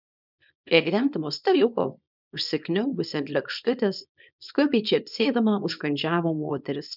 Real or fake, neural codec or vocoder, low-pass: fake; codec, 24 kHz, 0.9 kbps, WavTokenizer, small release; 5.4 kHz